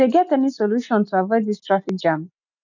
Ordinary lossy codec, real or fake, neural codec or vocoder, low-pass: none; real; none; 7.2 kHz